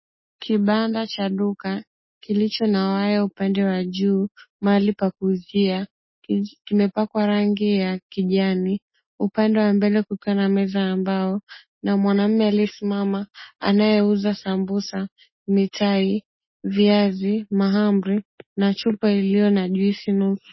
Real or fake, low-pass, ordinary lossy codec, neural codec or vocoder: real; 7.2 kHz; MP3, 24 kbps; none